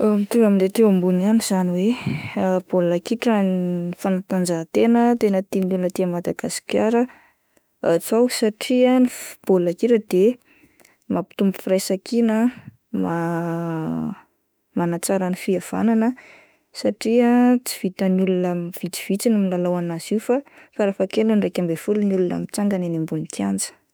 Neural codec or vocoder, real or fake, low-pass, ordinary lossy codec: autoencoder, 48 kHz, 32 numbers a frame, DAC-VAE, trained on Japanese speech; fake; none; none